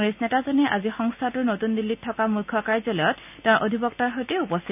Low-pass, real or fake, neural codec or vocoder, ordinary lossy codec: 3.6 kHz; real; none; none